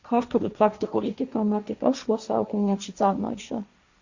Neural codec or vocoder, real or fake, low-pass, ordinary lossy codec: codec, 16 kHz, 1.1 kbps, Voila-Tokenizer; fake; 7.2 kHz; Opus, 64 kbps